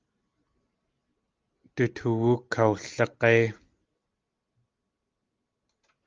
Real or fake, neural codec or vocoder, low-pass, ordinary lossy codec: real; none; 7.2 kHz; Opus, 32 kbps